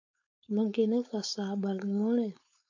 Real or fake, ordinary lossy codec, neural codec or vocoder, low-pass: fake; MP3, 48 kbps; codec, 16 kHz, 4.8 kbps, FACodec; 7.2 kHz